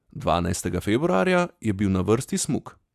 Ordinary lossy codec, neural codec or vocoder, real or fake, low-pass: Opus, 64 kbps; none; real; 14.4 kHz